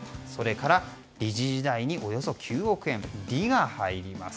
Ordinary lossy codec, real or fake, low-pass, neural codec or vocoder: none; real; none; none